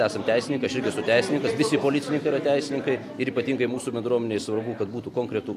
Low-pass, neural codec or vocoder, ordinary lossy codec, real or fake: 14.4 kHz; none; AAC, 64 kbps; real